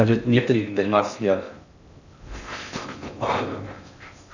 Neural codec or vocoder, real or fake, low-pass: codec, 16 kHz in and 24 kHz out, 0.6 kbps, FocalCodec, streaming, 4096 codes; fake; 7.2 kHz